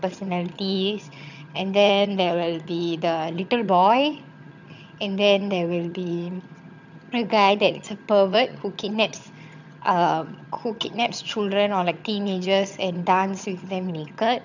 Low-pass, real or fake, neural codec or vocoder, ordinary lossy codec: 7.2 kHz; fake; vocoder, 22.05 kHz, 80 mel bands, HiFi-GAN; none